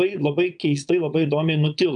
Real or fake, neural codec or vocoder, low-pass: fake; vocoder, 22.05 kHz, 80 mel bands, Vocos; 9.9 kHz